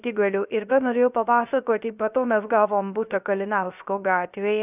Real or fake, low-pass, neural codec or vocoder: fake; 3.6 kHz; codec, 16 kHz, 0.3 kbps, FocalCodec